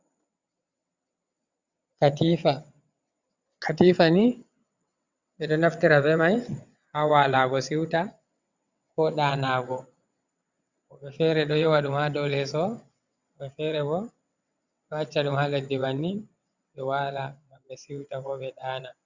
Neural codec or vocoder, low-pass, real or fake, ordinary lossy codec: vocoder, 22.05 kHz, 80 mel bands, WaveNeXt; 7.2 kHz; fake; Opus, 64 kbps